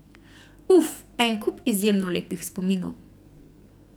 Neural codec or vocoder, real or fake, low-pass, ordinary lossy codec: codec, 44.1 kHz, 2.6 kbps, SNAC; fake; none; none